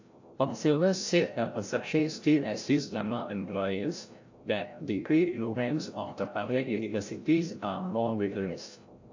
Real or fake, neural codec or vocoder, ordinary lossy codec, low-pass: fake; codec, 16 kHz, 0.5 kbps, FreqCodec, larger model; AAC, 48 kbps; 7.2 kHz